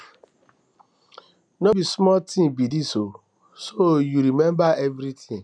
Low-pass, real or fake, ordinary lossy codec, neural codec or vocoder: 9.9 kHz; real; none; none